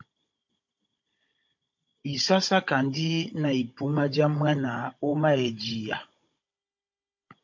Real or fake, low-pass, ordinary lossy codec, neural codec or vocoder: fake; 7.2 kHz; MP3, 48 kbps; codec, 16 kHz, 16 kbps, FunCodec, trained on Chinese and English, 50 frames a second